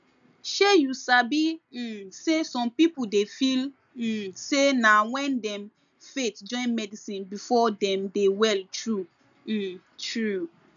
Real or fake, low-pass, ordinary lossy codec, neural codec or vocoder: real; 7.2 kHz; none; none